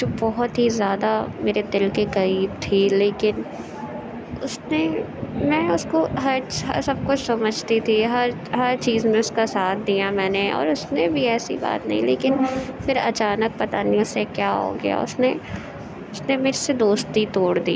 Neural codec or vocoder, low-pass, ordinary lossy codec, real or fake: none; none; none; real